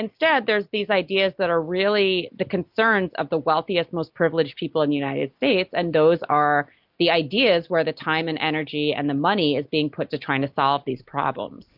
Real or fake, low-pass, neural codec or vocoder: real; 5.4 kHz; none